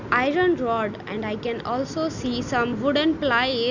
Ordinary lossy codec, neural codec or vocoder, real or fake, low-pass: none; none; real; 7.2 kHz